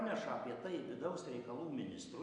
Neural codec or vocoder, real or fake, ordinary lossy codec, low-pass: none; real; AAC, 64 kbps; 9.9 kHz